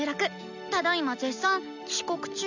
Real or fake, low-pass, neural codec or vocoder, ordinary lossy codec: real; 7.2 kHz; none; none